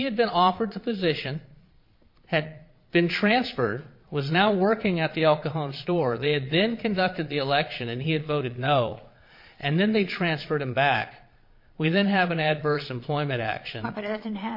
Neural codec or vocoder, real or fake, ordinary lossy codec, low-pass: vocoder, 22.05 kHz, 80 mel bands, Vocos; fake; MP3, 32 kbps; 5.4 kHz